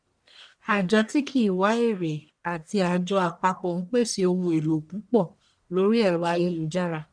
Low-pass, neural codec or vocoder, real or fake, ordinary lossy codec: 9.9 kHz; codec, 44.1 kHz, 1.7 kbps, Pupu-Codec; fake; none